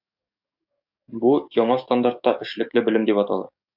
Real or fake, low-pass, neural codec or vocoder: fake; 5.4 kHz; codec, 44.1 kHz, 7.8 kbps, DAC